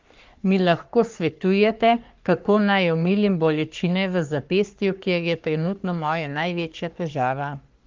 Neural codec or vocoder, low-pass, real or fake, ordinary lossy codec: codec, 44.1 kHz, 3.4 kbps, Pupu-Codec; 7.2 kHz; fake; Opus, 32 kbps